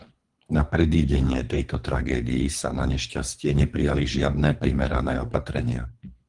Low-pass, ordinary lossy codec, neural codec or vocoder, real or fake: 10.8 kHz; Opus, 24 kbps; codec, 24 kHz, 3 kbps, HILCodec; fake